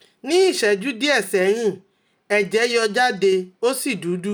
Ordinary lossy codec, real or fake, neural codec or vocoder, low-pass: none; real; none; none